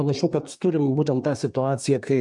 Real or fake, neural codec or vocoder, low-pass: fake; codec, 24 kHz, 1 kbps, SNAC; 10.8 kHz